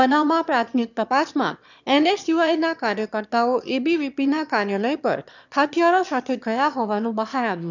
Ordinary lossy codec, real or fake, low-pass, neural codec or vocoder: none; fake; 7.2 kHz; autoencoder, 22.05 kHz, a latent of 192 numbers a frame, VITS, trained on one speaker